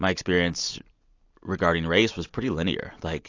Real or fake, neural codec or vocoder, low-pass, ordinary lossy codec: real; none; 7.2 kHz; AAC, 48 kbps